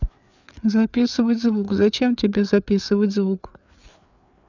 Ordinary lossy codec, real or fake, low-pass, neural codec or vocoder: none; fake; 7.2 kHz; codec, 16 kHz, 4 kbps, FreqCodec, larger model